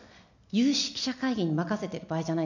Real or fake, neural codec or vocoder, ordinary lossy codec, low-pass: real; none; none; 7.2 kHz